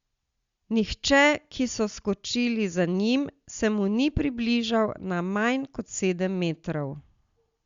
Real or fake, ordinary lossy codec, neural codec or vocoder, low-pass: real; Opus, 64 kbps; none; 7.2 kHz